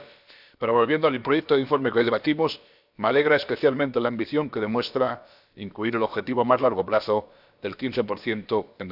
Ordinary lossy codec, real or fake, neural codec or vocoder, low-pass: none; fake; codec, 16 kHz, about 1 kbps, DyCAST, with the encoder's durations; 5.4 kHz